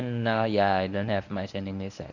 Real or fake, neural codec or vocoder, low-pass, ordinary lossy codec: fake; codec, 24 kHz, 0.9 kbps, WavTokenizer, medium speech release version 1; 7.2 kHz; none